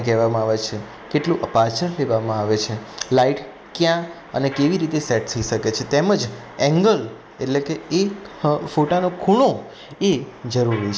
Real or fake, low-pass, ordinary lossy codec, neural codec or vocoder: real; none; none; none